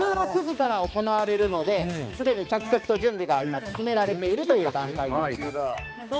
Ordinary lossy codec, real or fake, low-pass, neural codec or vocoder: none; fake; none; codec, 16 kHz, 2 kbps, X-Codec, HuBERT features, trained on balanced general audio